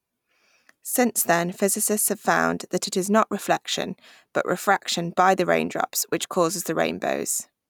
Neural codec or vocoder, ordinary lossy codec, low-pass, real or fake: none; none; none; real